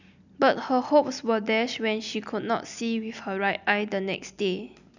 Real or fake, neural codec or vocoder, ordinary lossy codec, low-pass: real; none; none; 7.2 kHz